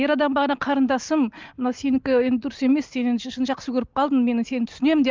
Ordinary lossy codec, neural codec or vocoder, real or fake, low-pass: Opus, 24 kbps; none; real; 7.2 kHz